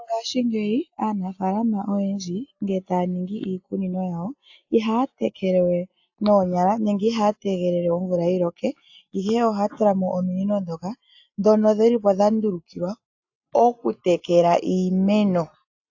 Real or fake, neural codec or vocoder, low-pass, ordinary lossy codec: real; none; 7.2 kHz; AAC, 48 kbps